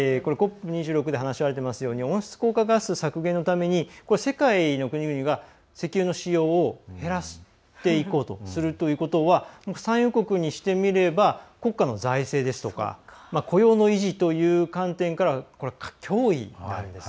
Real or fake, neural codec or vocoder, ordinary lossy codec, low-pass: real; none; none; none